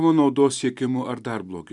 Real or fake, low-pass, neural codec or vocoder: real; 10.8 kHz; none